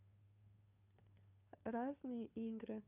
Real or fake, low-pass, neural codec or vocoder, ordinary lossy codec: fake; 3.6 kHz; codec, 16 kHz, 2 kbps, FunCodec, trained on Chinese and English, 25 frames a second; none